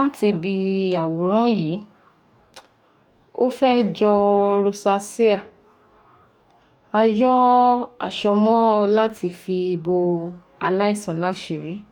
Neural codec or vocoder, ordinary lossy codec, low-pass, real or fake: codec, 44.1 kHz, 2.6 kbps, DAC; none; 19.8 kHz; fake